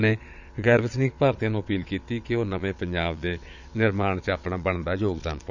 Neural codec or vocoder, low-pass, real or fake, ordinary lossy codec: vocoder, 22.05 kHz, 80 mel bands, Vocos; 7.2 kHz; fake; none